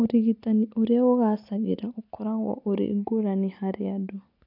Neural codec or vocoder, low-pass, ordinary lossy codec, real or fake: none; 5.4 kHz; none; real